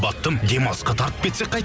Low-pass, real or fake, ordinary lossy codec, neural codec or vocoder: none; real; none; none